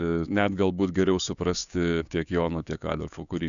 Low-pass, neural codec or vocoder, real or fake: 7.2 kHz; codec, 16 kHz, 6 kbps, DAC; fake